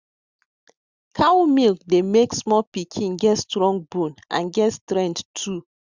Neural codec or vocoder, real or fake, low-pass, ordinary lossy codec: none; real; 7.2 kHz; Opus, 64 kbps